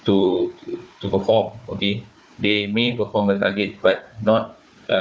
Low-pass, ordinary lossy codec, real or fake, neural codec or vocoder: none; none; fake; codec, 16 kHz, 4 kbps, FunCodec, trained on Chinese and English, 50 frames a second